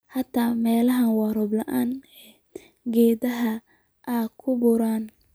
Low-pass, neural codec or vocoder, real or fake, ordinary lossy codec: none; none; real; none